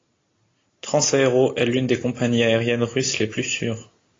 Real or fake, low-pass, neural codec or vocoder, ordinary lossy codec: real; 7.2 kHz; none; AAC, 32 kbps